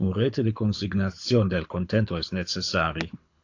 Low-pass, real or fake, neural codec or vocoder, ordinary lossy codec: 7.2 kHz; fake; codec, 24 kHz, 6 kbps, HILCodec; AAC, 48 kbps